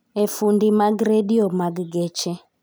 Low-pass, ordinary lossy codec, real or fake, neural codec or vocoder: none; none; real; none